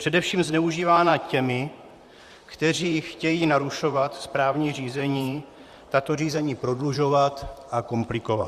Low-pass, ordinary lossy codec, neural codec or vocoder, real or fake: 14.4 kHz; Opus, 64 kbps; vocoder, 44.1 kHz, 128 mel bands, Pupu-Vocoder; fake